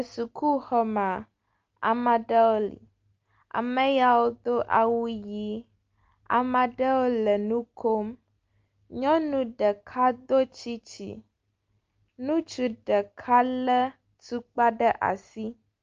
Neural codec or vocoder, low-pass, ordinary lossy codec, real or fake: none; 7.2 kHz; Opus, 24 kbps; real